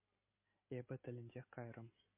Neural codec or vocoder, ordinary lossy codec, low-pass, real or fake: none; MP3, 24 kbps; 3.6 kHz; real